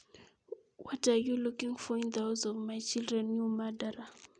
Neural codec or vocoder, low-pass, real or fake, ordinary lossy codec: none; 9.9 kHz; real; none